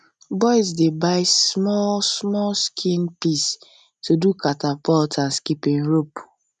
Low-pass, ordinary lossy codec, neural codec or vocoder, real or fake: none; none; none; real